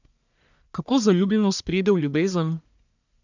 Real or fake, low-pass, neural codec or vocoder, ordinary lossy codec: fake; 7.2 kHz; codec, 44.1 kHz, 1.7 kbps, Pupu-Codec; none